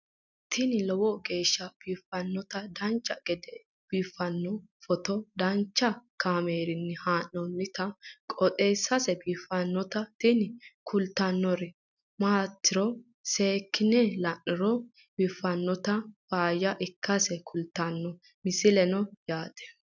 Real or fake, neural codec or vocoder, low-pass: real; none; 7.2 kHz